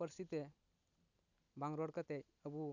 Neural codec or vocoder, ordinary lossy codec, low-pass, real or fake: none; none; 7.2 kHz; real